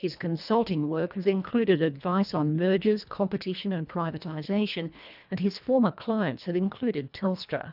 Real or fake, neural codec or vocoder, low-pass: fake; codec, 24 kHz, 1.5 kbps, HILCodec; 5.4 kHz